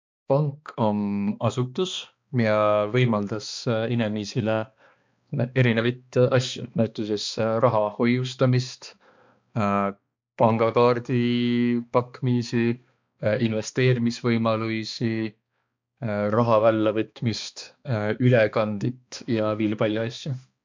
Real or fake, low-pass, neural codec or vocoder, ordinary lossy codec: fake; 7.2 kHz; codec, 16 kHz, 2 kbps, X-Codec, HuBERT features, trained on balanced general audio; MP3, 64 kbps